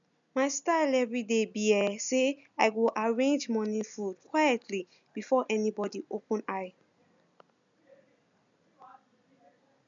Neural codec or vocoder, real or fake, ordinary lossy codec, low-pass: none; real; none; 7.2 kHz